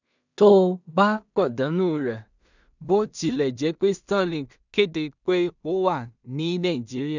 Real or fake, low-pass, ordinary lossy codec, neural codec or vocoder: fake; 7.2 kHz; none; codec, 16 kHz in and 24 kHz out, 0.4 kbps, LongCat-Audio-Codec, two codebook decoder